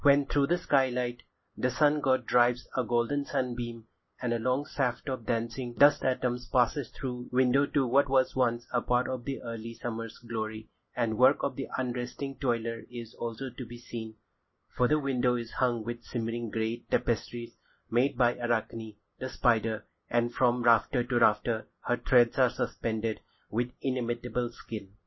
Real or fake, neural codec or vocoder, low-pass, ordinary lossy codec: real; none; 7.2 kHz; MP3, 24 kbps